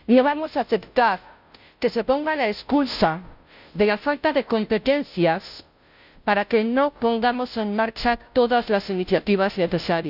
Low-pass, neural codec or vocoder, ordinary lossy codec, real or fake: 5.4 kHz; codec, 16 kHz, 0.5 kbps, FunCodec, trained on Chinese and English, 25 frames a second; none; fake